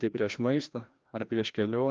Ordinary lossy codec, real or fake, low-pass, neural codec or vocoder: Opus, 24 kbps; fake; 7.2 kHz; codec, 16 kHz, 1 kbps, FreqCodec, larger model